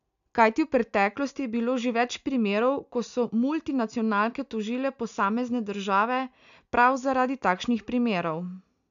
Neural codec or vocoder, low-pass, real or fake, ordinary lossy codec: none; 7.2 kHz; real; none